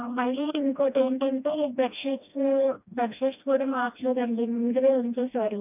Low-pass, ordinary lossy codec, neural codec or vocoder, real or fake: 3.6 kHz; none; codec, 16 kHz, 1 kbps, FreqCodec, smaller model; fake